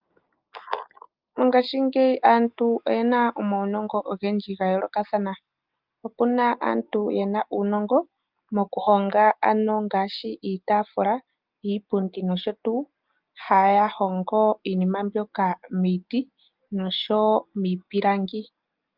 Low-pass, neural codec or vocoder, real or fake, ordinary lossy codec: 5.4 kHz; none; real; Opus, 32 kbps